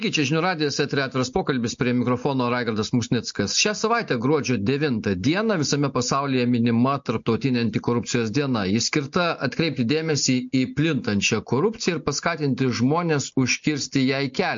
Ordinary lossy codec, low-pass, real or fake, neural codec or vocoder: MP3, 48 kbps; 7.2 kHz; real; none